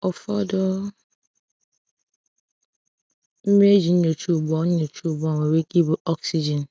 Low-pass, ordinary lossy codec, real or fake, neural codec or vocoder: none; none; real; none